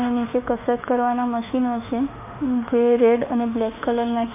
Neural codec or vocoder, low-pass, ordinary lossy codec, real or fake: autoencoder, 48 kHz, 32 numbers a frame, DAC-VAE, trained on Japanese speech; 3.6 kHz; AAC, 32 kbps; fake